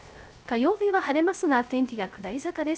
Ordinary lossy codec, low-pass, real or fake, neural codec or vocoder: none; none; fake; codec, 16 kHz, 0.3 kbps, FocalCodec